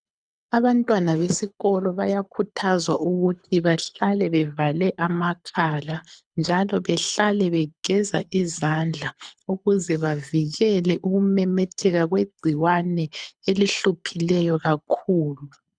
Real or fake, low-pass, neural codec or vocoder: fake; 9.9 kHz; codec, 24 kHz, 6 kbps, HILCodec